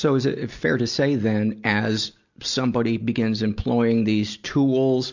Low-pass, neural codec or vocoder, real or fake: 7.2 kHz; none; real